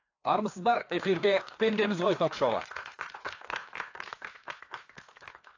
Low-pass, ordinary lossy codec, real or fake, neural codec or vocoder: 7.2 kHz; AAC, 48 kbps; fake; codec, 16 kHz, 2 kbps, FreqCodec, larger model